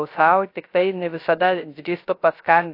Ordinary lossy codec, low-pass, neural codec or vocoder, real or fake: AAC, 32 kbps; 5.4 kHz; codec, 16 kHz, 0.3 kbps, FocalCodec; fake